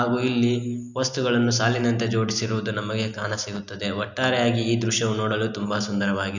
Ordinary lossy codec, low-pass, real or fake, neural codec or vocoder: none; 7.2 kHz; real; none